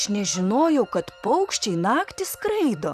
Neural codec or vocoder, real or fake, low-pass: vocoder, 44.1 kHz, 128 mel bands, Pupu-Vocoder; fake; 14.4 kHz